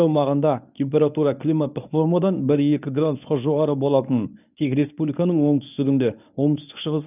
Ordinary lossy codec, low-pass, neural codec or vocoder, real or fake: none; 3.6 kHz; codec, 24 kHz, 0.9 kbps, WavTokenizer, medium speech release version 1; fake